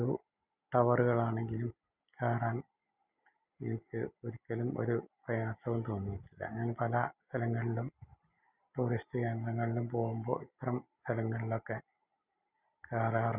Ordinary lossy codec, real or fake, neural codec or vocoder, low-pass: Opus, 64 kbps; real; none; 3.6 kHz